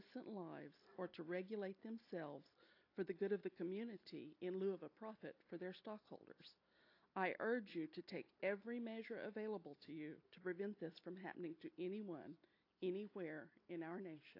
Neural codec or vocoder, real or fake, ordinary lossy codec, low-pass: none; real; AAC, 32 kbps; 5.4 kHz